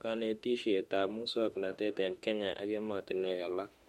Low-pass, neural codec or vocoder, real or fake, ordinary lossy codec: 19.8 kHz; autoencoder, 48 kHz, 32 numbers a frame, DAC-VAE, trained on Japanese speech; fake; MP3, 64 kbps